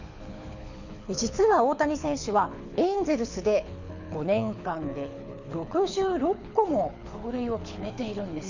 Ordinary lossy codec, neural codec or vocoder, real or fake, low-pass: none; codec, 24 kHz, 6 kbps, HILCodec; fake; 7.2 kHz